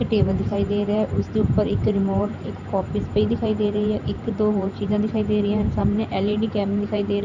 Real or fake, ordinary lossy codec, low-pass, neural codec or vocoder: fake; none; 7.2 kHz; vocoder, 44.1 kHz, 128 mel bands every 512 samples, BigVGAN v2